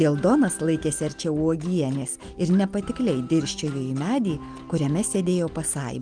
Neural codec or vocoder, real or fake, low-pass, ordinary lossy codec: none; real; 9.9 kHz; Opus, 32 kbps